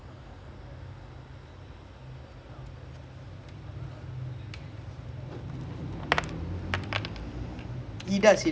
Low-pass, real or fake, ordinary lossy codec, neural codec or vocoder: none; real; none; none